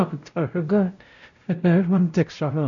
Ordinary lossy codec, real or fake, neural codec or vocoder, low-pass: none; fake; codec, 16 kHz, 0.5 kbps, X-Codec, WavLM features, trained on Multilingual LibriSpeech; 7.2 kHz